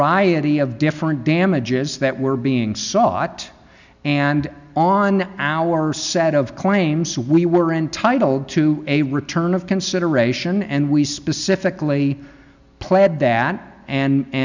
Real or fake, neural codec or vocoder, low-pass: real; none; 7.2 kHz